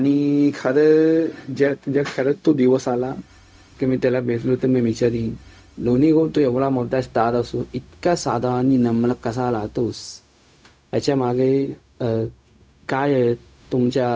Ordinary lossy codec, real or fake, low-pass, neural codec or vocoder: none; fake; none; codec, 16 kHz, 0.4 kbps, LongCat-Audio-Codec